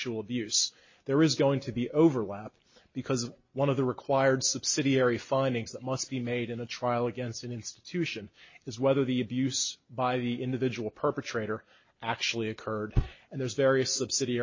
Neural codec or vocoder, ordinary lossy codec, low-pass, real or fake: none; MP3, 32 kbps; 7.2 kHz; real